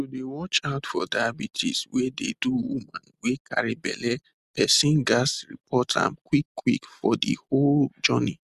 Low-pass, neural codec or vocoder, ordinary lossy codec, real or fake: 14.4 kHz; none; Opus, 64 kbps; real